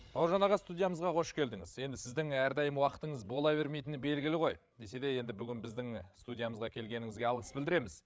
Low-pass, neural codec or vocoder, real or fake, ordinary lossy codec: none; codec, 16 kHz, 8 kbps, FreqCodec, larger model; fake; none